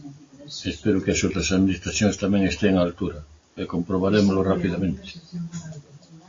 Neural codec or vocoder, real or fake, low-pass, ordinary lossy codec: none; real; 7.2 kHz; AAC, 32 kbps